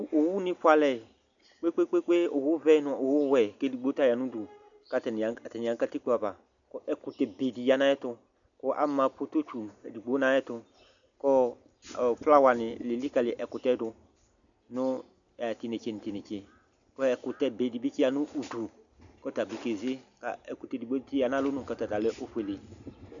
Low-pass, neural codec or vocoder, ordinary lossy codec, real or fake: 7.2 kHz; none; MP3, 96 kbps; real